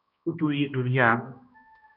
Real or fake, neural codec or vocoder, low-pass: fake; codec, 16 kHz, 1 kbps, X-Codec, HuBERT features, trained on balanced general audio; 5.4 kHz